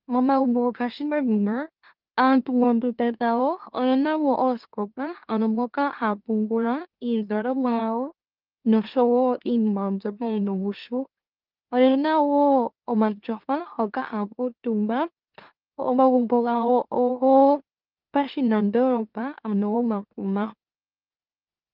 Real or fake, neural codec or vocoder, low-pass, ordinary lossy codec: fake; autoencoder, 44.1 kHz, a latent of 192 numbers a frame, MeloTTS; 5.4 kHz; Opus, 32 kbps